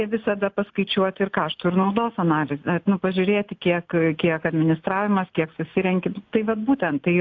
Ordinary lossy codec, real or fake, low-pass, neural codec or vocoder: AAC, 48 kbps; real; 7.2 kHz; none